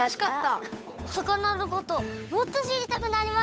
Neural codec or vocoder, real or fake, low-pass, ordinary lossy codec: codec, 16 kHz, 8 kbps, FunCodec, trained on Chinese and English, 25 frames a second; fake; none; none